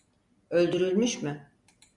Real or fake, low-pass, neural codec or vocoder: real; 10.8 kHz; none